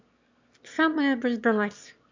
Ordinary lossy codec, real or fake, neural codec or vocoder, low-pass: MP3, 64 kbps; fake; autoencoder, 22.05 kHz, a latent of 192 numbers a frame, VITS, trained on one speaker; 7.2 kHz